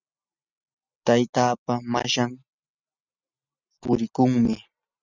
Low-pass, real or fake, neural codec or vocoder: 7.2 kHz; real; none